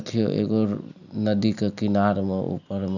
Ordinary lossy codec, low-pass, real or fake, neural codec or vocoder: none; 7.2 kHz; real; none